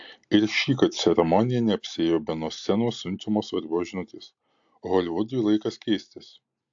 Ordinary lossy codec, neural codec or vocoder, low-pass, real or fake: AAC, 64 kbps; none; 7.2 kHz; real